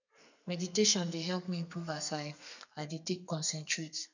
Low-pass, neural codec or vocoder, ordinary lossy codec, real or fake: 7.2 kHz; codec, 32 kHz, 1.9 kbps, SNAC; none; fake